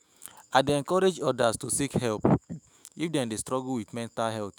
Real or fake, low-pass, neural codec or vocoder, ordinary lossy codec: fake; none; autoencoder, 48 kHz, 128 numbers a frame, DAC-VAE, trained on Japanese speech; none